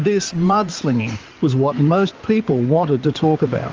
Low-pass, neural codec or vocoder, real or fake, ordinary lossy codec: 7.2 kHz; codec, 16 kHz in and 24 kHz out, 2.2 kbps, FireRedTTS-2 codec; fake; Opus, 24 kbps